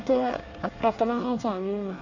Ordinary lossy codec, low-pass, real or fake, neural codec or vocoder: none; 7.2 kHz; fake; codec, 24 kHz, 1 kbps, SNAC